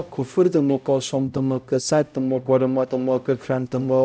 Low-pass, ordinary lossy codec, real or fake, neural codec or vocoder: none; none; fake; codec, 16 kHz, 0.5 kbps, X-Codec, HuBERT features, trained on LibriSpeech